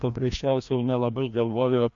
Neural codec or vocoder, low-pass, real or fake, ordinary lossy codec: codec, 16 kHz, 1 kbps, FreqCodec, larger model; 7.2 kHz; fake; AAC, 64 kbps